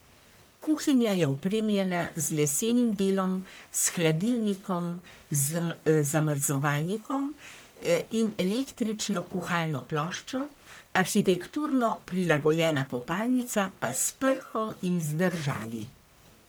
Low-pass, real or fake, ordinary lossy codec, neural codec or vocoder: none; fake; none; codec, 44.1 kHz, 1.7 kbps, Pupu-Codec